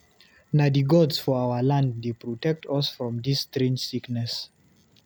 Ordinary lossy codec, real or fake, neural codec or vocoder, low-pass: none; real; none; none